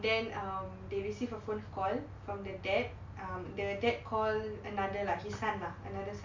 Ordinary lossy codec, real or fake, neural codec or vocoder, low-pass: MP3, 64 kbps; real; none; 7.2 kHz